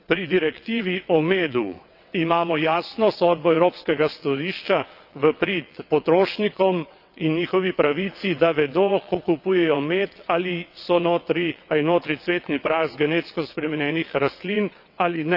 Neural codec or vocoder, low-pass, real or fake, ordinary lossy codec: vocoder, 22.05 kHz, 80 mel bands, WaveNeXt; 5.4 kHz; fake; none